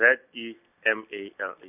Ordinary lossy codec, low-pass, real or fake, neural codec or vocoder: none; 3.6 kHz; real; none